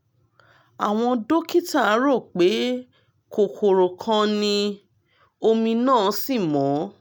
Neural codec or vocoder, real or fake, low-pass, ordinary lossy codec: none; real; none; none